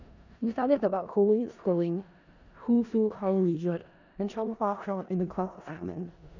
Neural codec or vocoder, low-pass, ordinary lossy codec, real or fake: codec, 16 kHz in and 24 kHz out, 0.4 kbps, LongCat-Audio-Codec, four codebook decoder; 7.2 kHz; none; fake